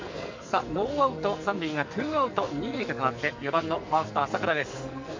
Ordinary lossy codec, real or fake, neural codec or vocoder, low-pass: MP3, 64 kbps; fake; codec, 44.1 kHz, 2.6 kbps, SNAC; 7.2 kHz